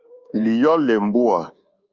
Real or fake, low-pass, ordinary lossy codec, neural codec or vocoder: fake; 7.2 kHz; Opus, 24 kbps; codec, 24 kHz, 1.2 kbps, DualCodec